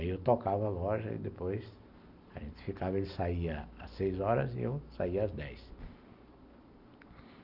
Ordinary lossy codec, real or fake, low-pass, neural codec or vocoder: none; real; 5.4 kHz; none